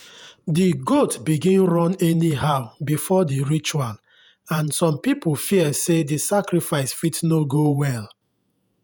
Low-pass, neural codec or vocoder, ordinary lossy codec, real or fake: none; vocoder, 48 kHz, 128 mel bands, Vocos; none; fake